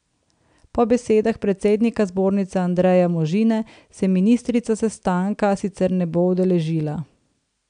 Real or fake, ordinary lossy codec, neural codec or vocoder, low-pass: real; none; none; 9.9 kHz